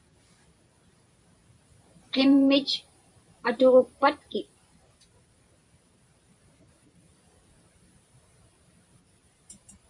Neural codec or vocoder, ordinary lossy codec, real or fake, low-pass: vocoder, 44.1 kHz, 128 mel bands every 512 samples, BigVGAN v2; MP3, 64 kbps; fake; 10.8 kHz